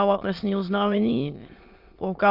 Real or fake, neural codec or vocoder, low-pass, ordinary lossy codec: fake; autoencoder, 22.05 kHz, a latent of 192 numbers a frame, VITS, trained on many speakers; 5.4 kHz; Opus, 24 kbps